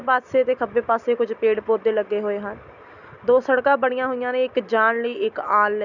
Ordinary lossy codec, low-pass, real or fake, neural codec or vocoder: none; 7.2 kHz; real; none